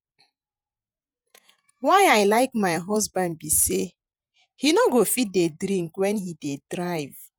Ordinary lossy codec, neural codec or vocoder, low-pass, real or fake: none; vocoder, 48 kHz, 128 mel bands, Vocos; none; fake